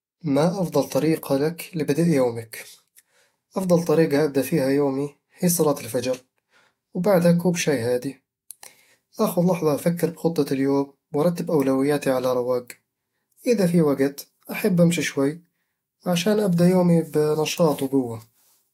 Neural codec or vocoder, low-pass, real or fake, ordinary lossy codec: autoencoder, 48 kHz, 128 numbers a frame, DAC-VAE, trained on Japanese speech; 19.8 kHz; fake; AAC, 48 kbps